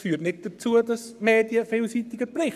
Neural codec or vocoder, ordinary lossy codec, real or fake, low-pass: codec, 44.1 kHz, 7.8 kbps, Pupu-Codec; none; fake; 14.4 kHz